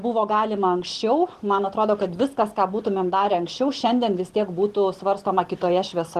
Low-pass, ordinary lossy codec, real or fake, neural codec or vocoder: 14.4 kHz; Opus, 16 kbps; real; none